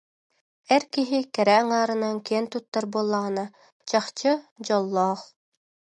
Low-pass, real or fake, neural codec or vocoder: 10.8 kHz; real; none